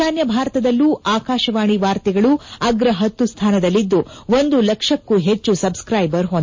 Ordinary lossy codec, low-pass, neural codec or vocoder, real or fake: MP3, 32 kbps; 7.2 kHz; none; real